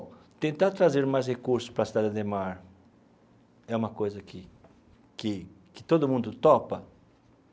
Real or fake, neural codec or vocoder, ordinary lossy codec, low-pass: real; none; none; none